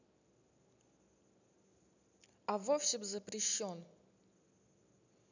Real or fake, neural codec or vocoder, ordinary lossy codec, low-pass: real; none; none; 7.2 kHz